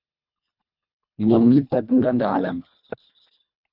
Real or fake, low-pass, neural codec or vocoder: fake; 5.4 kHz; codec, 24 kHz, 1.5 kbps, HILCodec